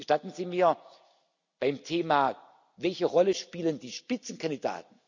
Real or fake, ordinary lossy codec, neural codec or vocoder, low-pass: real; none; none; 7.2 kHz